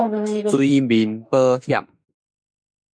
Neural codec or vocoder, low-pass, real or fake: autoencoder, 48 kHz, 32 numbers a frame, DAC-VAE, trained on Japanese speech; 9.9 kHz; fake